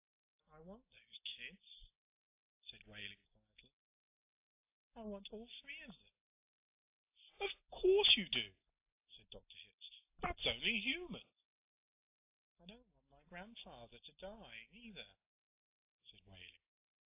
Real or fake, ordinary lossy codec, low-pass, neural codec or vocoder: real; AAC, 32 kbps; 3.6 kHz; none